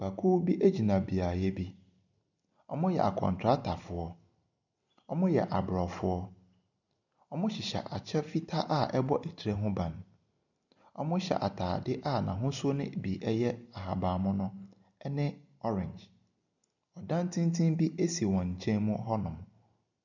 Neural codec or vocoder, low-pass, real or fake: none; 7.2 kHz; real